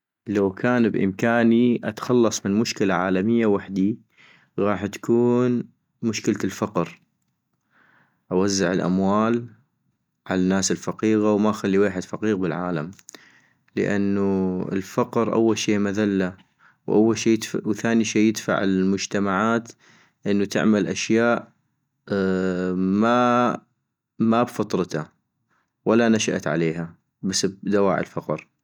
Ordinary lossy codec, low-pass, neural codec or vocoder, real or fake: none; 19.8 kHz; none; real